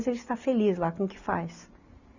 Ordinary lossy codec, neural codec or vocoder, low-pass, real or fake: none; none; 7.2 kHz; real